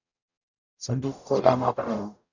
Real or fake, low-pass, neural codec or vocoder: fake; 7.2 kHz; codec, 44.1 kHz, 0.9 kbps, DAC